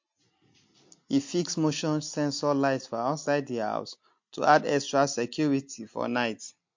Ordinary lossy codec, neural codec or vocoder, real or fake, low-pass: MP3, 48 kbps; none; real; 7.2 kHz